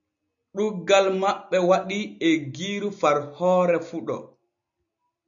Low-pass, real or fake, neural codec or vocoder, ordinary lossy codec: 7.2 kHz; real; none; MP3, 96 kbps